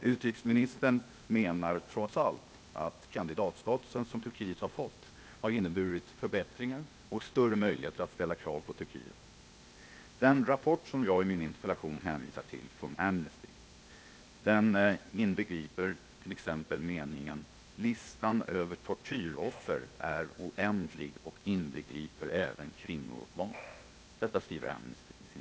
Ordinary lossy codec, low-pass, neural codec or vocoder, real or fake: none; none; codec, 16 kHz, 0.8 kbps, ZipCodec; fake